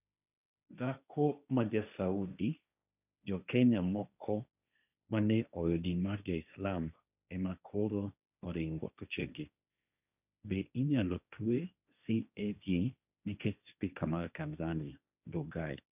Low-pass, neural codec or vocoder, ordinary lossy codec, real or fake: 3.6 kHz; codec, 16 kHz, 1.1 kbps, Voila-Tokenizer; none; fake